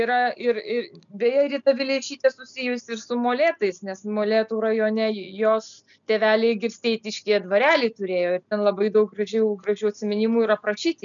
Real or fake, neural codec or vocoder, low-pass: real; none; 7.2 kHz